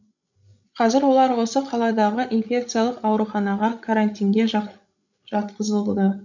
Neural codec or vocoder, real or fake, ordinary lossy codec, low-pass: codec, 16 kHz, 16 kbps, FreqCodec, larger model; fake; none; 7.2 kHz